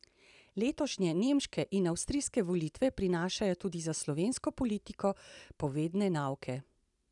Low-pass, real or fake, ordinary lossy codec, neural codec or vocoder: 10.8 kHz; real; none; none